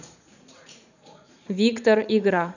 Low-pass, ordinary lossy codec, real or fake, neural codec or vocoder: 7.2 kHz; none; fake; vocoder, 44.1 kHz, 80 mel bands, Vocos